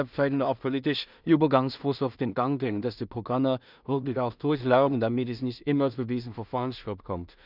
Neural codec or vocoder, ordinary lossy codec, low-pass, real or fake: codec, 16 kHz in and 24 kHz out, 0.4 kbps, LongCat-Audio-Codec, two codebook decoder; none; 5.4 kHz; fake